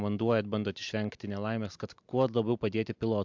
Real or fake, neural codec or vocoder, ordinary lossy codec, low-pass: fake; vocoder, 44.1 kHz, 128 mel bands every 256 samples, BigVGAN v2; MP3, 64 kbps; 7.2 kHz